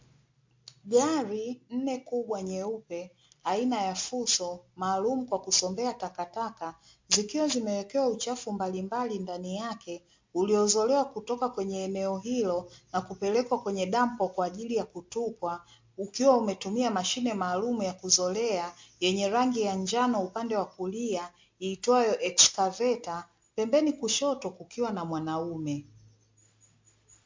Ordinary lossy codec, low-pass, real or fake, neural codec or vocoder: MP3, 48 kbps; 7.2 kHz; real; none